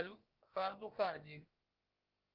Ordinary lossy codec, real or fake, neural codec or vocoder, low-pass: Opus, 32 kbps; fake; codec, 16 kHz, about 1 kbps, DyCAST, with the encoder's durations; 5.4 kHz